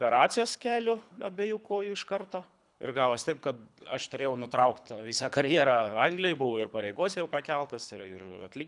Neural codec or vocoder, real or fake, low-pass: codec, 24 kHz, 3 kbps, HILCodec; fake; 10.8 kHz